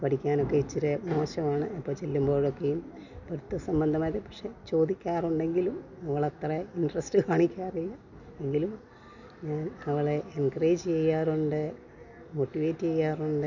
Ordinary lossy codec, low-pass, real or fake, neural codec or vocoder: none; 7.2 kHz; real; none